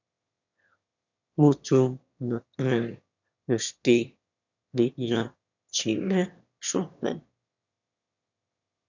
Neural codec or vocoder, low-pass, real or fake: autoencoder, 22.05 kHz, a latent of 192 numbers a frame, VITS, trained on one speaker; 7.2 kHz; fake